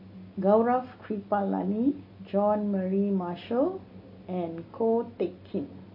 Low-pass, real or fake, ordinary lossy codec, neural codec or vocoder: 5.4 kHz; real; MP3, 24 kbps; none